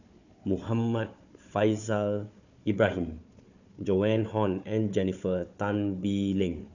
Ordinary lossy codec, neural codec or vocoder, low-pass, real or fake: none; codec, 16 kHz, 4 kbps, FunCodec, trained on Chinese and English, 50 frames a second; 7.2 kHz; fake